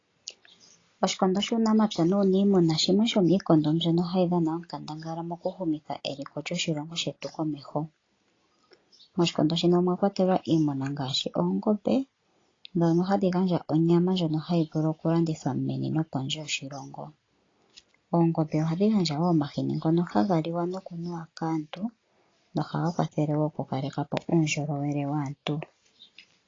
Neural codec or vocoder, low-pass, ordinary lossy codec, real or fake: none; 7.2 kHz; AAC, 32 kbps; real